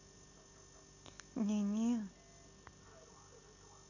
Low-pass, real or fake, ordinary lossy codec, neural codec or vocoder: 7.2 kHz; real; none; none